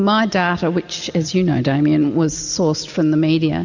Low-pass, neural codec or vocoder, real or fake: 7.2 kHz; none; real